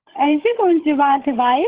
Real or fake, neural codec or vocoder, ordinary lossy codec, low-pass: fake; codec, 16 kHz, 16 kbps, FunCodec, trained on LibriTTS, 50 frames a second; Opus, 16 kbps; 3.6 kHz